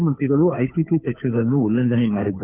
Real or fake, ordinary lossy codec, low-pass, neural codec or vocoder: fake; none; 3.6 kHz; codec, 16 kHz, 2 kbps, FunCodec, trained on Chinese and English, 25 frames a second